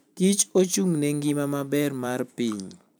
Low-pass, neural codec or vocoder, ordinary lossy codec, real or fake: none; vocoder, 44.1 kHz, 128 mel bands every 512 samples, BigVGAN v2; none; fake